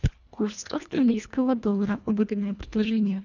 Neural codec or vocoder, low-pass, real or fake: codec, 24 kHz, 1.5 kbps, HILCodec; 7.2 kHz; fake